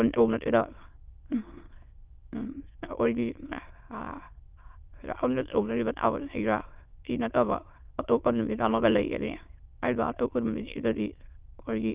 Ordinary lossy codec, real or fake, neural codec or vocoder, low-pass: Opus, 24 kbps; fake; autoencoder, 22.05 kHz, a latent of 192 numbers a frame, VITS, trained on many speakers; 3.6 kHz